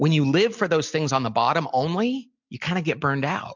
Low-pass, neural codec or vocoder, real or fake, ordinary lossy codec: 7.2 kHz; none; real; MP3, 64 kbps